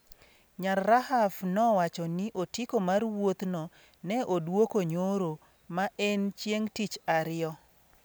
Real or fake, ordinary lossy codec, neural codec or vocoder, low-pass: real; none; none; none